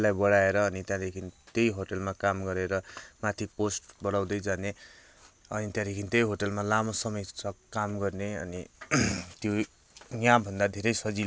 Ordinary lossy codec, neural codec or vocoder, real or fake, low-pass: none; none; real; none